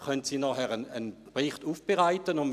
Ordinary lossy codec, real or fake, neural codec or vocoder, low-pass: none; real; none; 14.4 kHz